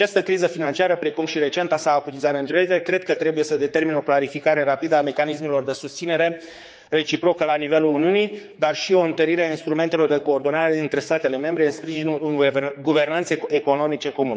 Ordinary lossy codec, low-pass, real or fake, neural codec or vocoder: none; none; fake; codec, 16 kHz, 4 kbps, X-Codec, HuBERT features, trained on general audio